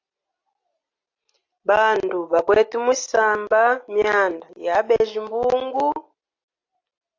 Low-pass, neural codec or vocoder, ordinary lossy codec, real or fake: 7.2 kHz; none; AAC, 48 kbps; real